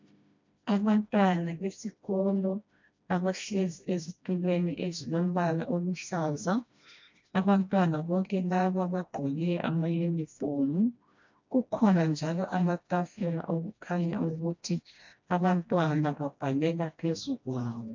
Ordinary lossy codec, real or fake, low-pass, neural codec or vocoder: MP3, 64 kbps; fake; 7.2 kHz; codec, 16 kHz, 1 kbps, FreqCodec, smaller model